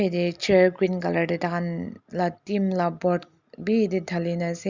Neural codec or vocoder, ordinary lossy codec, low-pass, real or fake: none; Opus, 64 kbps; 7.2 kHz; real